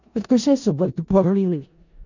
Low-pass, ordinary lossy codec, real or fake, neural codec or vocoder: 7.2 kHz; none; fake; codec, 16 kHz in and 24 kHz out, 0.4 kbps, LongCat-Audio-Codec, four codebook decoder